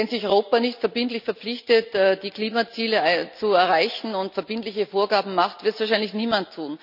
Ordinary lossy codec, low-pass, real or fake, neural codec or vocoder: none; 5.4 kHz; real; none